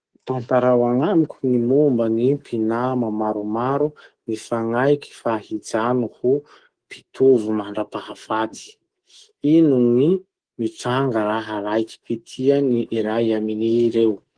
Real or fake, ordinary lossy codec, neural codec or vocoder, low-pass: fake; Opus, 16 kbps; codec, 24 kHz, 3.1 kbps, DualCodec; 9.9 kHz